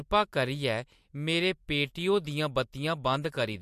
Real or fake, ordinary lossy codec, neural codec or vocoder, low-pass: real; MP3, 64 kbps; none; 14.4 kHz